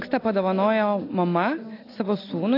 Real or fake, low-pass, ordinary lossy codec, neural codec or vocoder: real; 5.4 kHz; AAC, 32 kbps; none